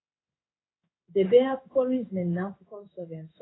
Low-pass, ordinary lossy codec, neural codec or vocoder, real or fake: 7.2 kHz; AAC, 16 kbps; codec, 16 kHz in and 24 kHz out, 1 kbps, XY-Tokenizer; fake